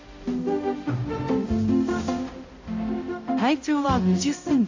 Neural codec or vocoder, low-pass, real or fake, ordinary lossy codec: codec, 16 kHz, 0.5 kbps, X-Codec, HuBERT features, trained on balanced general audio; 7.2 kHz; fake; AAC, 48 kbps